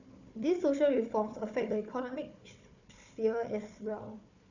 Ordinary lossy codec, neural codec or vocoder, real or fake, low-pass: none; codec, 16 kHz, 4 kbps, FunCodec, trained on Chinese and English, 50 frames a second; fake; 7.2 kHz